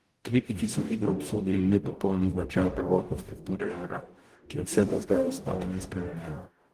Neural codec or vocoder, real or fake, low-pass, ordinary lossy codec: codec, 44.1 kHz, 0.9 kbps, DAC; fake; 14.4 kHz; Opus, 24 kbps